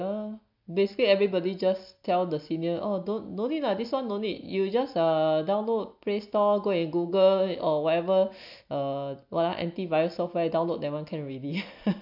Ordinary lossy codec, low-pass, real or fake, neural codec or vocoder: MP3, 48 kbps; 5.4 kHz; real; none